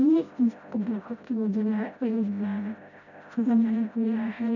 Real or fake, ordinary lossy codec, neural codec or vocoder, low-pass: fake; none; codec, 16 kHz, 0.5 kbps, FreqCodec, smaller model; 7.2 kHz